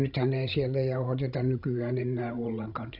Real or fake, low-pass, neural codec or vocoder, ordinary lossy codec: fake; 5.4 kHz; codec, 16 kHz, 16 kbps, FreqCodec, larger model; none